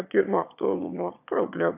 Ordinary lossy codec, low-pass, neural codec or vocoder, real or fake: AAC, 32 kbps; 3.6 kHz; autoencoder, 22.05 kHz, a latent of 192 numbers a frame, VITS, trained on one speaker; fake